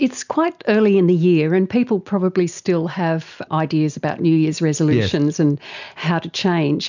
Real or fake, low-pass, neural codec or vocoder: real; 7.2 kHz; none